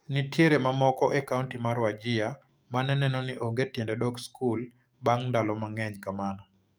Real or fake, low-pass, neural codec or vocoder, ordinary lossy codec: fake; none; codec, 44.1 kHz, 7.8 kbps, DAC; none